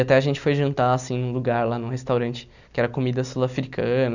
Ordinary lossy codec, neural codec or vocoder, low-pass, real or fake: none; none; 7.2 kHz; real